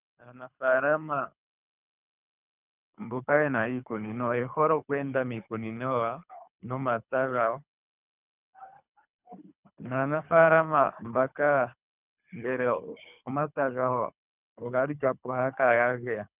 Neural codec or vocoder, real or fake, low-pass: codec, 24 kHz, 3 kbps, HILCodec; fake; 3.6 kHz